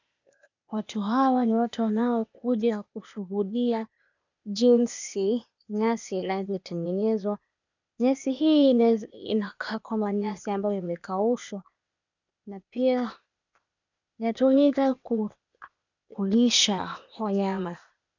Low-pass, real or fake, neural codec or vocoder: 7.2 kHz; fake; codec, 16 kHz, 0.8 kbps, ZipCodec